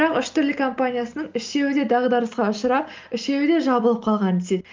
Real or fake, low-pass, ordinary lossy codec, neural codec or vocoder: real; 7.2 kHz; Opus, 32 kbps; none